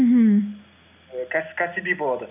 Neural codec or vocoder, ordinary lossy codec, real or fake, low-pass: none; none; real; 3.6 kHz